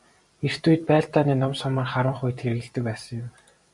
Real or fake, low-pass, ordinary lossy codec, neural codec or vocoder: fake; 10.8 kHz; MP3, 96 kbps; vocoder, 44.1 kHz, 128 mel bands every 256 samples, BigVGAN v2